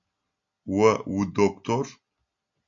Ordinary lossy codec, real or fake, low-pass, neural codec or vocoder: AAC, 64 kbps; real; 7.2 kHz; none